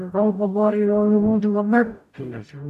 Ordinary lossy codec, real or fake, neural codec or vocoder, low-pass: none; fake; codec, 44.1 kHz, 0.9 kbps, DAC; 14.4 kHz